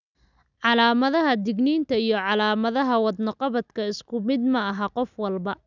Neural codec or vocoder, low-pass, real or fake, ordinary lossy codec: none; 7.2 kHz; real; none